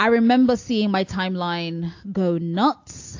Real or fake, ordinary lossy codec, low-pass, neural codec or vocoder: real; AAC, 48 kbps; 7.2 kHz; none